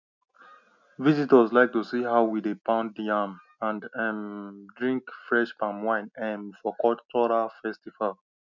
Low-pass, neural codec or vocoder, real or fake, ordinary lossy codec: 7.2 kHz; none; real; none